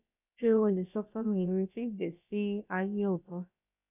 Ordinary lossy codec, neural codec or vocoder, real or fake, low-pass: none; codec, 16 kHz, about 1 kbps, DyCAST, with the encoder's durations; fake; 3.6 kHz